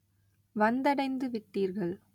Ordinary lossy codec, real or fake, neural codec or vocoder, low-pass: none; real; none; 19.8 kHz